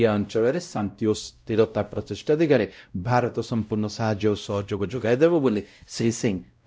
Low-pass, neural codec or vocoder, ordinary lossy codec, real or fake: none; codec, 16 kHz, 0.5 kbps, X-Codec, WavLM features, trained on Multilingual LibriSpeech; none; fake